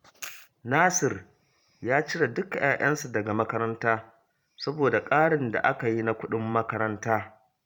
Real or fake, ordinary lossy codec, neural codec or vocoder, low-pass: fake; none; vocoder, 44.1 kHz, 128 mel bands every 512 samples, BigVGAN v2; 19.8 kHz